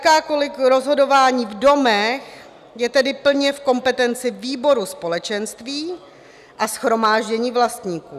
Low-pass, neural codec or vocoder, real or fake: 14.4 kHz; none; real